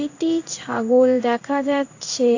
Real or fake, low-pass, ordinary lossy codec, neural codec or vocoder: fake; 7.2 kHz; AAC, 48 kbps; codec, 16 kHz in and 24 kHz out, 1 kbps, XY-Tokenizer